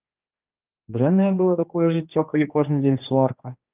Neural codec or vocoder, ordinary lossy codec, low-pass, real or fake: codec, 16 kHz, 2 kbps, X-Codec, HuBERT features, trained on general audio; Opus, 24 kbps; 3.6 kHz; fake